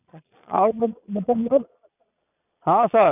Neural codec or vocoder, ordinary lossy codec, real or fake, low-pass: vocoder, 22.05 kHz, 80 mel bands, Vocos; none; fake; 3.6 kHz